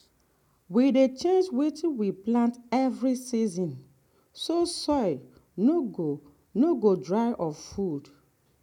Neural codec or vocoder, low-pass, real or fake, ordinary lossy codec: none; 19.8 kHz; real; none